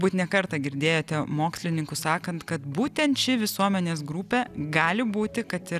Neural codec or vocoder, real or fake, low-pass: none; real; 14.4 kHz